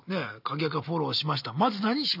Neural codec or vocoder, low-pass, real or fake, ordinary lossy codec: none; 5.4 kHz; real; none